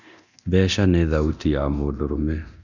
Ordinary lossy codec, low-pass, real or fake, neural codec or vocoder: none; 7.2 kHz; fake; codec, 24 kHz, 0.9 kbps, DualCodec